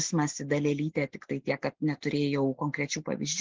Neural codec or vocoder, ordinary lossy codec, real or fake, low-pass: none; Opus, 16 kbps; real; 7.2 kHz